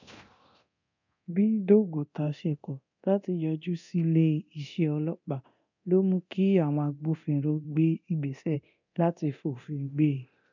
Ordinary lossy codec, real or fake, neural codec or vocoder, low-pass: none; fake; codec, 24 kHz, 0.9 kbps, DualCodec; 7.2 kHz